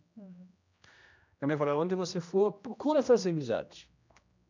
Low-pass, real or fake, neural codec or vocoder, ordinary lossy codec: 7.2 kHz; fake; codec, 16 kHz, 1 kbps, X-Codec, HuBERT features, trained on general audio; MP3, 64 kbps